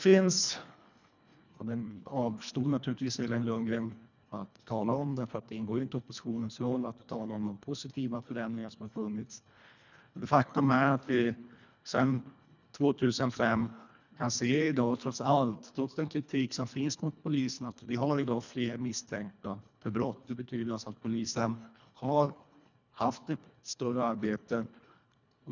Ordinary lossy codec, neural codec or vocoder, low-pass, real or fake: none; codec, 24 kHz, 1.5 kbps, HILCodec; 7.2 kHz; fake